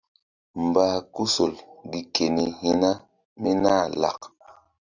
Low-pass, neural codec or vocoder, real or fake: 7.2 kHz; none; real